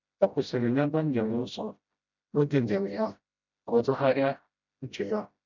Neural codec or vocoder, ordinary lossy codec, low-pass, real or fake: codec, 16 kHz, 0.5 kbps, FreqCodec, smaller model; none; 7.2 kHz; fake